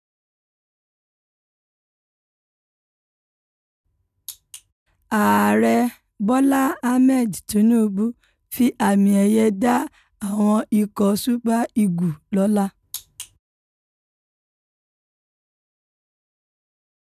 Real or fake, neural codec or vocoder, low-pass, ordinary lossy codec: real; none; 14.4 kHz; none